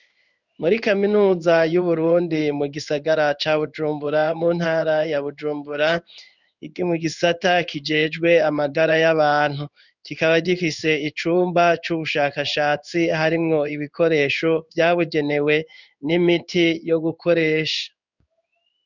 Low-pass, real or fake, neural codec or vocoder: 7.2 kHz; fake; codec, 16 kHz in and 24 kHz out, 1 kbps, XY-Tokenizer